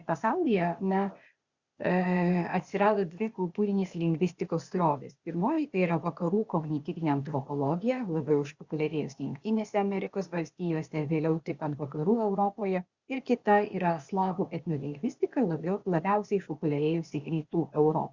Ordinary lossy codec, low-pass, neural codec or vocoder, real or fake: Opus, 64 kbps; 7.2 kHz; codec, 16 kHz, 0.8 kbps, ZipCodec; fake